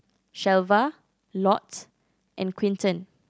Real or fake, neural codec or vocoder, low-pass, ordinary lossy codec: real; none; none; none